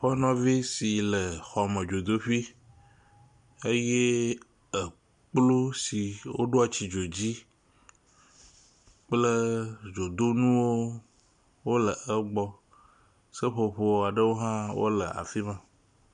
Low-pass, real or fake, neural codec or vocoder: 9.9 kHz; real; none